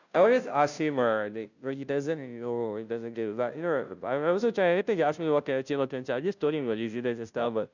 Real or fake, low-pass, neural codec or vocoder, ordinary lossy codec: fake; 7.2 kHz; codec, 16 kHz, 0.5 kbps, FunCodec, trained on Chinese and English, 25 frames a second; none